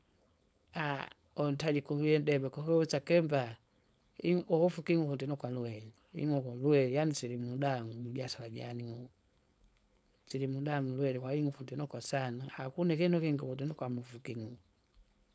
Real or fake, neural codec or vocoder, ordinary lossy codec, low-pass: fake; codec, 16 kHz, 4.8 kbps, FACodec; none; none